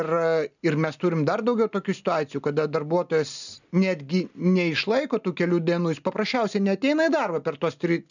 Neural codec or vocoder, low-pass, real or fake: none; 7.2 kHz; real